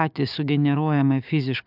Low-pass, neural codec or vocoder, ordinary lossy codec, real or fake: 5.4 kHz; codec, 16 kHz, 6 kbps, DAC; AAC, 48 kbps; fake